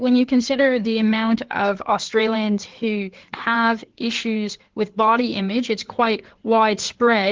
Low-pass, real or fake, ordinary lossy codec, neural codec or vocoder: 7.2 kHz; fake; Opus, 16 kbps; codec, 16 kHz in and 24 kHz out, 2.2 kbps, FireRedTTS-2 codec